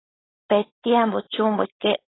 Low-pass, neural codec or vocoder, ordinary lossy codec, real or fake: 7.2 kHz; codec, 16 kHz, 4.8 kbps, FACodec; AAC, 16 kbps; fake